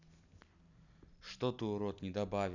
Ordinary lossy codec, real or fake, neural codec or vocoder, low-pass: none; real; none; 7.2 kHz